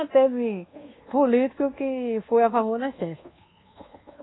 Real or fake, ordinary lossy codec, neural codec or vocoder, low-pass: fake; AAC, 16 kbps; codec, 24 kHz, 1.2 kbps, DualCodec; 7.2 kHz